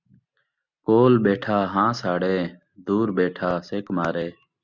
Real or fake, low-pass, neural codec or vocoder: real; 7.2 kHz; none